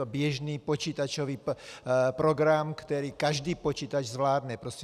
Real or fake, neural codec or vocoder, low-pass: real; none; 14.4 kHz